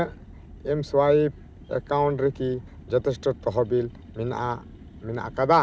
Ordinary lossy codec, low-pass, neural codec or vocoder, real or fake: none; none; none; real